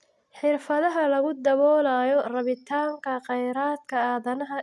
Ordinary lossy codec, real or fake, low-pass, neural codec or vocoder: none; real; none; none